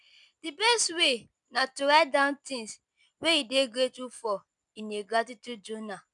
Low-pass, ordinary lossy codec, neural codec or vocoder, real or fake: 10.8 kHz; none; none; real